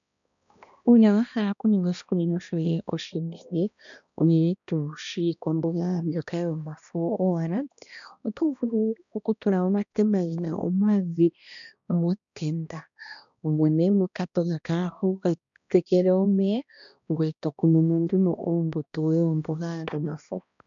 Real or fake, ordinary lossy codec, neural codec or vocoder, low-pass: fake; MP3, 96 kbps; codec, 16 kHz, 1 kbps, X-Codec, HuBERT features, trained on balanced general audio; 7.2 kHz